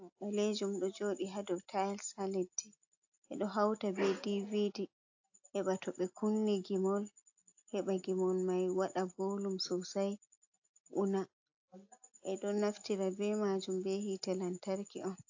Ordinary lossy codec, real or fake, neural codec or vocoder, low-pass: AAC, 48 kbps; real; none; 7.2 kHz